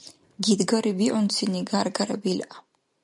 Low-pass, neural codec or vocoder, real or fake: 10.8 kHz; none; real